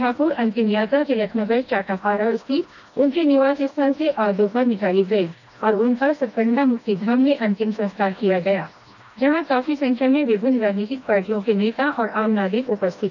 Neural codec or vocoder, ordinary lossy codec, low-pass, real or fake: codec, 16 kHz, 1 kbps, FreqCodec, smaller model; none; 7.2 kHz; fake